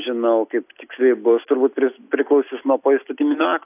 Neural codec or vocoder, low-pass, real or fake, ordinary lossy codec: none; 3.6 kHz; real; AAC, 32 kbps